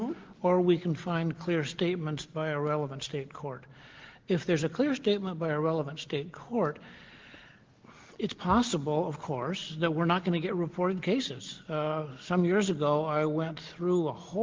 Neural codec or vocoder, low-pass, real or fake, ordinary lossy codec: none; 7.2 kHz; real; Opus, 16 kbps